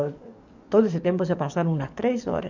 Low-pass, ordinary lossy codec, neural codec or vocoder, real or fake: 7.2 kHz; none; codec, 44.1 kHz, 7.8 kbps, DAC; fake